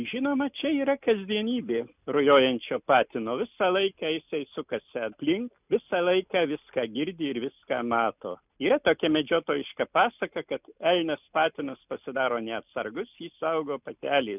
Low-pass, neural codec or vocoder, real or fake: 3.6 kHz; none; real